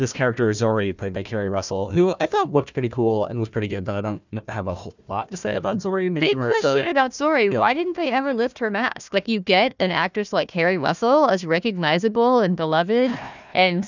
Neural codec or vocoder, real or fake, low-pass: codec, 16 kHz, 1 kbps, FunCodec, trained on Chinese and English, 50 frames a second; fake; 7.2 kHz